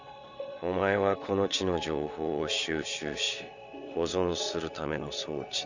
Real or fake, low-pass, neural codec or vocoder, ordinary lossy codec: fake; 7.2 kHz; vocoder, 22.05 kHz, 80 mel bands, WaveNeXt; Opus, 64 kbps